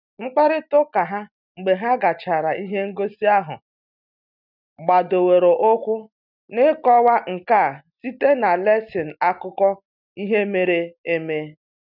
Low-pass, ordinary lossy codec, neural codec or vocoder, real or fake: 5.4 kHz; none; none; real